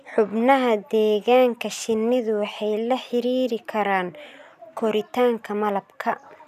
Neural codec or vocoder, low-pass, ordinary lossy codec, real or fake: none; 14.4 kHz; none; real